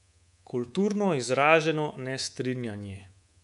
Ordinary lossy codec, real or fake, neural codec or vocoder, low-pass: none; fake; codec, 24 kHz, 3.1 kbps, DualCodec; 10.8 kHz